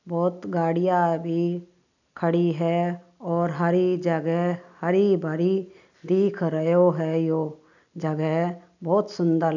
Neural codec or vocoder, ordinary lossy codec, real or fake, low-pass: none; none; real; 7.2 kHz